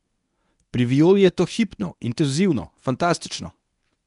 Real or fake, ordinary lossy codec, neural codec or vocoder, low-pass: fake; none; codec, 24 kHz, 0.9 kbps, WavTokenizer, medium speech release version 1; 10.8 kHz